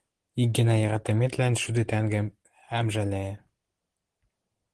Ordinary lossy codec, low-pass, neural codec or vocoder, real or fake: Opus, 16 kbps; 10.8 kHz; none; real